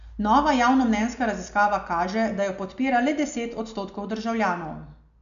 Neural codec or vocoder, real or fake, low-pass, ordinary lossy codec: none; real; 7.2 kHz; none